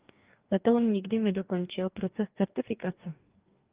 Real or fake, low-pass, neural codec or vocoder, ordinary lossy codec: fake; 3.6 kHz; codec, 44.1 kHz, 2.6 kbps, DAC; Opus, 24 kbps